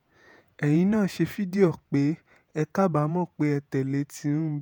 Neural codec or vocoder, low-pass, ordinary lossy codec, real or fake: vocoder, 48 kHz, 128 mel bands, Vocos; none; none; fake